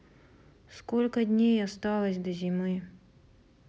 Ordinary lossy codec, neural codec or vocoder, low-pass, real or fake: none; none; none; real